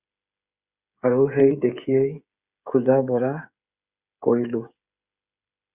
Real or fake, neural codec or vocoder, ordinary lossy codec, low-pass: fake; codec, 16 kHz, 8 kbps, FreqCodec, smaller model; Opus, 64 kbps; 3.6 kHz